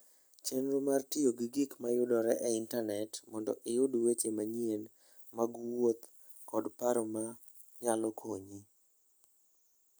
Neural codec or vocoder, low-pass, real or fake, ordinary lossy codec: none; none; real; none